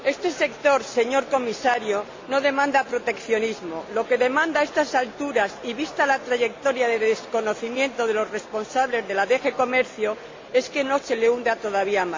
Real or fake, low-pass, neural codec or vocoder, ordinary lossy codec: real; 7.2 kHz; none; MP3, 48 kbps